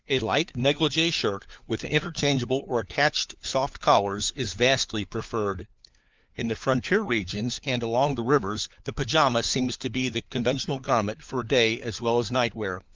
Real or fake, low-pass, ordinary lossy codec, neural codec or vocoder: fake; 7.2 kHz; Opus, 24 kbps; codec, 16 kHz, 4 kbps, FunCodec, trained on LibriTTS, 50 frames a second